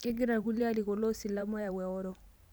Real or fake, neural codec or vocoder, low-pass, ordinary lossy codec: fake; vocoder, 44.1 kHz, 128 mel bands every 512 samples, BigVGAN v2; none; none